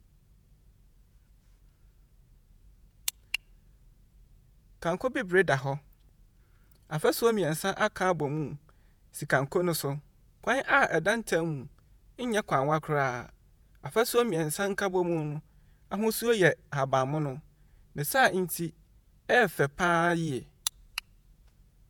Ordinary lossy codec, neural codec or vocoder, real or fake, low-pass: none; vocoder, 48 kHz, 128 mel bands, Vocos; fake; none